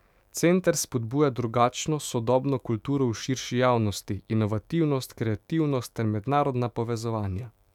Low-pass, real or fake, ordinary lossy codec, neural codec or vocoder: 19.8 kHz; fake; none; autoencoder, 48 kHz, 128 numbers a frame, DAC-VAE, trained on Japanese speech